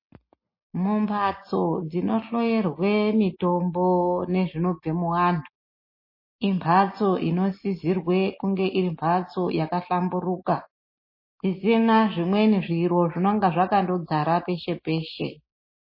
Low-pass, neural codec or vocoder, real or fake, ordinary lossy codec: 5.4 kHz; none; real; MP3, 24 kbps